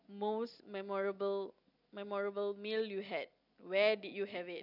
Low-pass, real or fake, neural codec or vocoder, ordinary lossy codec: 5.4 kHz; real; none; none